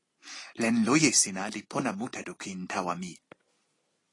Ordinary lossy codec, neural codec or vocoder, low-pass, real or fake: AAC, 32 kbps; none; 10.8 kHz; real